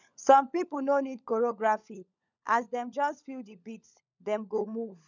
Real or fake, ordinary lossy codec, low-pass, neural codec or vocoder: fake; none; 7.2 kHz; codec, 16 kHz, 16 kbps, FunCodec, trained on LibriTTS, 50 frames a second